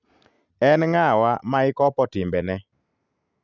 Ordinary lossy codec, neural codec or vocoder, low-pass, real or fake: none; none; 7.2 kHz; real